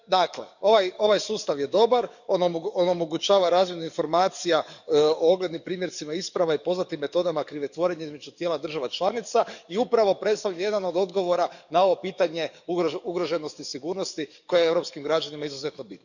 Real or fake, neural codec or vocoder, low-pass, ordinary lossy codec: fake; codec, 44.1 kHz, 7.8 kbps, DAC; 7.2 kHz; none